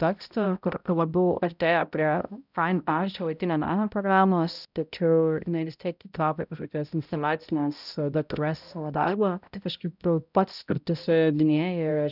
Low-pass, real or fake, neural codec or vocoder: 5.4 kHz; fake; codec, 16 kHz, 0.5 kbps, X-Codec, HuBERT features, trained on balanced general audio